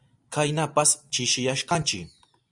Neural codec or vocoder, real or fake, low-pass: none; real; 10.8 kHz